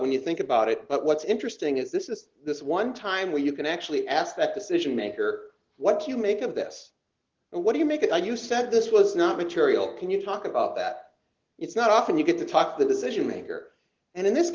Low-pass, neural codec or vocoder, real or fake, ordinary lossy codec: 7.2 kHz; none; real; Opus, 16 kbps